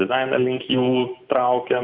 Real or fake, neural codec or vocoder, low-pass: real; none; 5.4 kHz